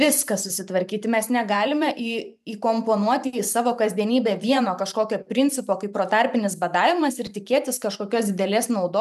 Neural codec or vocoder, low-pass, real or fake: vocoder, 44.1 kHz, 128 mel bands every 512 samples, BigVGAN v2; 14.4 kHz; fake